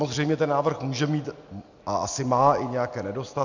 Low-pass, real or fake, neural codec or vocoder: 7.2 kHz; real; none